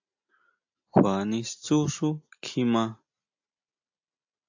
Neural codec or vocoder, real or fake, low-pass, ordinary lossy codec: none; real; 7.2 kHz; AAC, 48 kbps